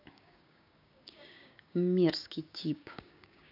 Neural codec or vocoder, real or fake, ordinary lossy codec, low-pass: codec, 16 kHz, 6 kbps, DAC; fake; none; 5.4 kHz